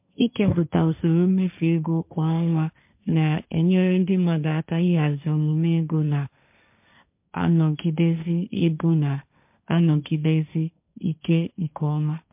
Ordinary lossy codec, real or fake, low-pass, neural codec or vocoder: MP3, 32 kbps; fake; 3.6 kHz; codec, 16 kHz, 1.1 kbps, Voila-Tokenizer